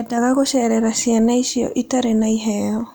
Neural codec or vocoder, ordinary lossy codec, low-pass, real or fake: none; none; none; real